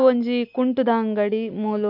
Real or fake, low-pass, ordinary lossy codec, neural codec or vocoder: real; 5.4 kHz; none; none